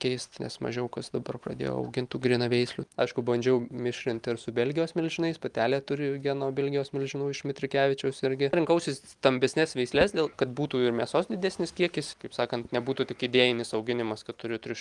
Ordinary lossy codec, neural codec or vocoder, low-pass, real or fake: Opus, 32 kbps; none; 10.8 kHz; real